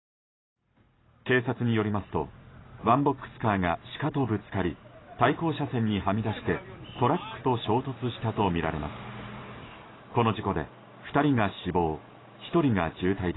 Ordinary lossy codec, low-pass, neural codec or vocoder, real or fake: AAC, 16 kbps; 7.2 kHz; vocoder, 44.1 kHz, 128 mel bands every 512 samples, BigVGAN v2; fake